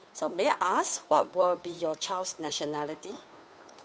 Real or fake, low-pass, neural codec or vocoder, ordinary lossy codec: fake; none; codec, 16 kHz, 2 kbps, FunCodec, trained on Chinese and English, 25 frames a second; none